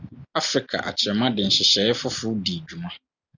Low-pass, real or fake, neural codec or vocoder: 7.2 kHz; real; none